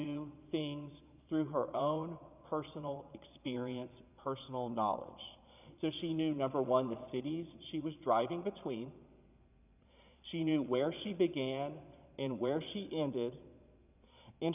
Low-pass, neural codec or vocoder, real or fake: 3.6 kHz; vocoder, 22.05 kHz, 80 mel bands, Vocos; fake